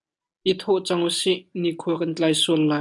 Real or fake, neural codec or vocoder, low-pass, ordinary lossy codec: real; none; 10.8 kHz; MP3, 96 kbps